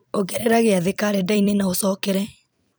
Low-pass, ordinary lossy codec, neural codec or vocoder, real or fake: none; none; vocoder, 44.1 kHz, 128 mel bands every 256 samples, BigVGAN v2; fake